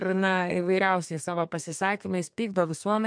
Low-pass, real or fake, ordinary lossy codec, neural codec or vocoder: 9.9 kHz; fake; MP3, 64 kbps; codec, 32 kHz, 1.9 kbps, SNAC